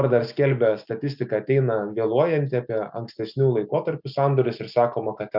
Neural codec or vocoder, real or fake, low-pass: none; real; 5.4 kHz